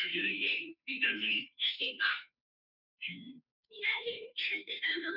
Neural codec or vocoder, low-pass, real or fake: codec, 16 kHz, 0.5 kbps, FunCodec, trained on Chinese and English, 25 frames a second; 5.4 kHz; fake